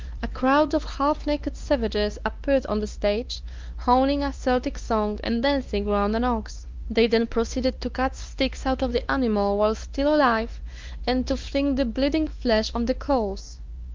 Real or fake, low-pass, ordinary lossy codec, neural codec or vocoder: fake; 7.2 kHz; Opus, 32 kbps; codec, 16 kHz, 2 kbps, X-Codec, WavLM features, trained on Multilingual LibriSpeech